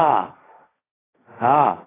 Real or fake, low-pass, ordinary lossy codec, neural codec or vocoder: fake; 3.6 kHz; AAC, 16 kbps; codec, 16 kHz, 1.1 kbps, Voila-Tokenizer